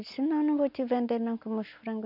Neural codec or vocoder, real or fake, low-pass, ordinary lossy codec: none; real; 5.4 kHz; none